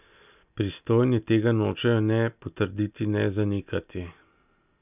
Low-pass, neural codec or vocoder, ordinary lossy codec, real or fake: 3.6 kHz; none; none; real